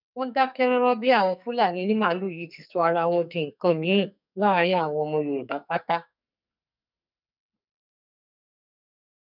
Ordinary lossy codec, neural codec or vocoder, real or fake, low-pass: none; codec, 44.1 kHz, 2.6 kbps, SNAC; fake; 5.4 kHz